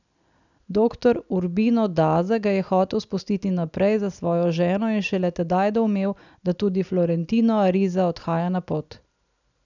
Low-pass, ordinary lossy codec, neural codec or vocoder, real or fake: 7.2 kHz; none; none; real